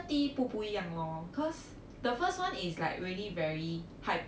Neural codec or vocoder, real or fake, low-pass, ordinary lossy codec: none; real; none; none